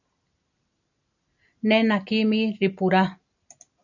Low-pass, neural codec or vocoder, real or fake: 7.2 kHz; none; real